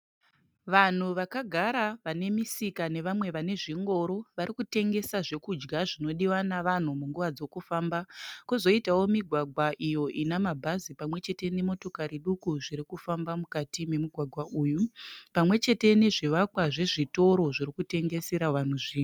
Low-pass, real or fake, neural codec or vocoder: 19.8 kHz; real; none